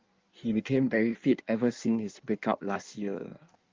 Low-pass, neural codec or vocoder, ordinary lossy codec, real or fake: 7.2 kHz; codec, 16 kHz in and 24 kHz out, 1.1 kbps, FireRedTTS-2 codec; Opus, 32 kbps; fake